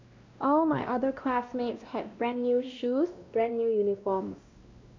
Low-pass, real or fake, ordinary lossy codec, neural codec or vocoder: 7.2 kHz; fake; MP3, 64 kbps; codec, 16 kHz, 1 kbps, X-Codec, WavLM features, trained on Multilingual LibriSpeech